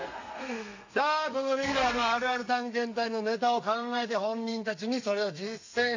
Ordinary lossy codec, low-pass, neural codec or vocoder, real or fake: AAC, 48 kbps; 7.2 kHz; codec, 32 kHz, 1.9 kbps, SNAC; fake